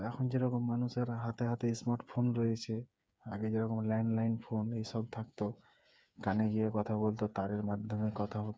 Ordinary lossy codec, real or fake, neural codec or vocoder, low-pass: none; fake; codec, 16 kHz, 8 kbps, FreqCodec, smaller model; none